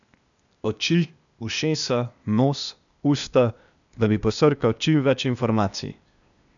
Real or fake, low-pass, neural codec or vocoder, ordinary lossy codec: fake; 7.2 kHz; codec, 16 kHz, 0.8 kbps, ZipCodec; none